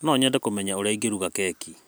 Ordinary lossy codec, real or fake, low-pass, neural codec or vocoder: none; real; none; none